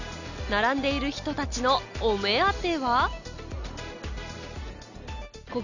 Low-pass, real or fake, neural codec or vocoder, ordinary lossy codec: 7.2 kHz; real; none; none